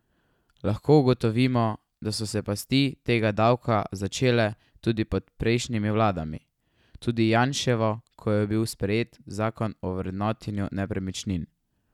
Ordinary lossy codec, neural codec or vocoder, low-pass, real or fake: none; none; 19.8 kHz; real